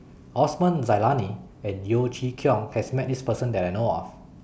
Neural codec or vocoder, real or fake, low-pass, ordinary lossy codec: none; real; none; none